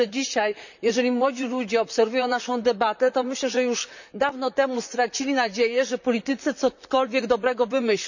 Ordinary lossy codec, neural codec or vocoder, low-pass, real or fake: none; vocoder, 44.1 kHz, 128 mel bands, Pupu-Vocoder; 7.2 kHz; fake